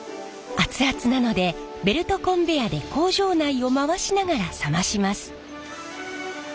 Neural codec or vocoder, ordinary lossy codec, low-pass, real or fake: none; none; none; real